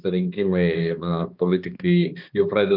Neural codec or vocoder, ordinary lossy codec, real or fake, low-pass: codec, 16 kHz, 2 kbps, X-Codec, HuBERT features, trained on balanced general audio; Opus, 24 kbps; fake; 5.4 kHz